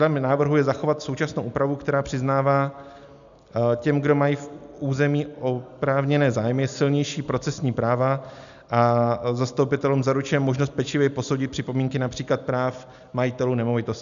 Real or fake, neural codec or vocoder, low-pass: real; none; 7.2 kHz